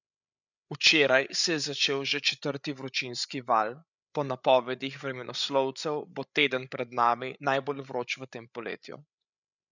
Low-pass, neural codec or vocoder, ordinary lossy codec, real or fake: 7.2 kHz; codec, 16 kHz, 8 kbps, FreqCodec, larger model; none; fake